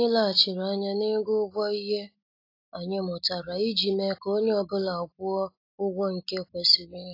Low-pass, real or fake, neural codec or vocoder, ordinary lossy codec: 5.4 kHz; real; none; AAC, 32 kbps